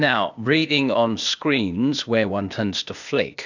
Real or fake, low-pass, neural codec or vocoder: fake; 7.2 kHz; codec, 16 kHz, 0.8 kbps, ZipCodec